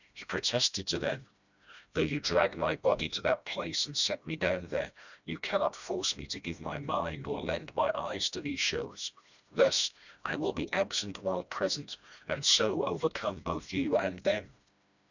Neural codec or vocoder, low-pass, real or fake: codec, 16 kHz, 1 kbps, FreqCodec, smaller model; 7.2 kHz; fake